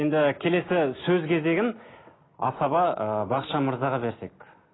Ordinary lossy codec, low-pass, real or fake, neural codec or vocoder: AAC, 16 kbps; 7.2 kHz; real; none